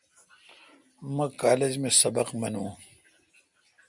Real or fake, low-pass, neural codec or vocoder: real; 10.8 kHz; none